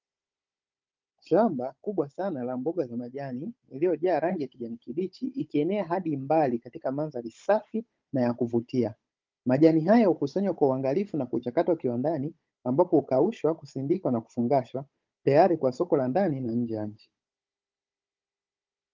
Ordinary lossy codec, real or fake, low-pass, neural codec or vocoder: Opus, 32 kbps; fake; 7.2 kHz; codec, 16 kHz, 16 kbps, FunCodec, trained on Chinese and English, 50 frames a second